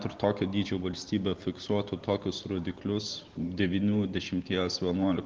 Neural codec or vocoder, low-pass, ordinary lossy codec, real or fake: none; 7.2 kHz; Opus, 16 kbps; real